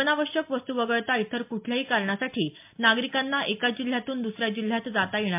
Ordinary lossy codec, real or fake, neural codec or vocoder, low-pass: MP3, 32 kbps; real; none; 3.6 kHz